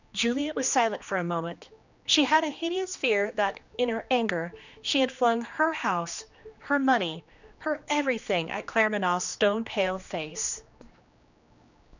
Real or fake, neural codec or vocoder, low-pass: fake; codec, 16 kHz, 2 kbps, X-Codec, HuBERT features, trained on general audio; 7.2 kHz